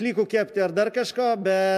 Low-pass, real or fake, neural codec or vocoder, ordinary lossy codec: 14.4 kHz; real; none; MP3, 96 kbps